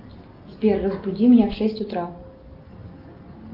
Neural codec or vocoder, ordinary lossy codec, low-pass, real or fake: none; Opus, 24 kbps; 5.4 kHz; real